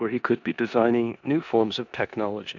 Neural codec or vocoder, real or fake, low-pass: codec, 16 kHz in and 24 kHz out, 0.9 kbps, LongCat-Audio-Codec, four codebook decoder; fake; 7.2 kHz